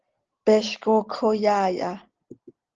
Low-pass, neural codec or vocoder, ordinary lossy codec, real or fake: 7.2 kHz; none; Opus, 16 kbps; real